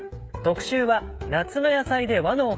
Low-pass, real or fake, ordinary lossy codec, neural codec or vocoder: none; fake; none; codec, 16 kHz, 8 kbps, FreqCodec, smaller model